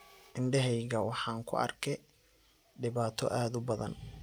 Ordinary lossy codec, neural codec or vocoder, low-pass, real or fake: none; none; none; real